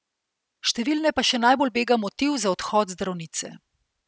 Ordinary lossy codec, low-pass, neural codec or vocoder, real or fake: none; none; none; real